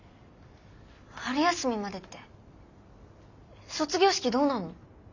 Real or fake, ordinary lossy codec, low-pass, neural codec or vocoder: real; none; 7.2 kHz; none